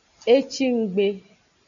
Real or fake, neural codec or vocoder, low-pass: real; none; 7.2 kHz